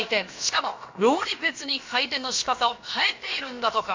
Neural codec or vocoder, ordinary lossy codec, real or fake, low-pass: codec, 16 kHz, about 1 kbps, DyCAST, with the encoder's durations; MP3, 48 kbps; fake; 7.2 kHz